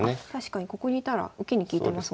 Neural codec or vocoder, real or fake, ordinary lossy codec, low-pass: none; real; none; none